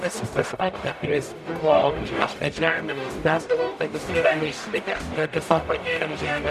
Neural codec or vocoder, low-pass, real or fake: codec, 44.1 kHz, 0.9 kbps, DAC; 14.4 kHz; fake